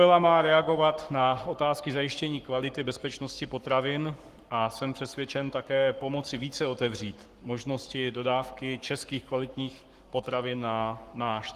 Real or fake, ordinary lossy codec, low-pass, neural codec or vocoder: fake; Opus, 24 kbps; 14.4 kHz; codec, 44.1 kHz, 7.8 kbps, Pupu-Codec